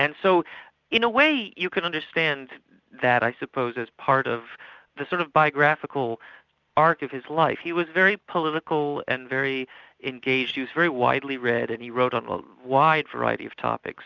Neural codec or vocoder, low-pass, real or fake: none; 7.2 kHz; real